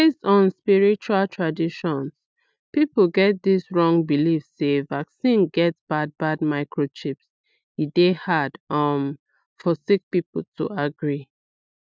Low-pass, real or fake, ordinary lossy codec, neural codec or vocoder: none; real; none; none